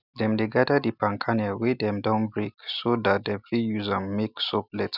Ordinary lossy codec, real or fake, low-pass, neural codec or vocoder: none; real; 5.4 kHz; none